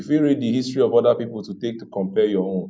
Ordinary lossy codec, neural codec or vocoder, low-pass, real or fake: none; none; none; real